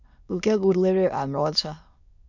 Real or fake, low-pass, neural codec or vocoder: fake; 7.2 kHz; autoencoder, 22.05 kHz, a latent of 192 numbers a frame, VITS, trained on many speakers